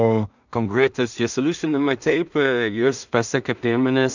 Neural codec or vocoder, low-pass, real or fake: codec, 16 kHz in and 24 kHz out, 0.4 kbps, LongCat-Audio-Codec, two codebook decoder; 7.2 kHz; fake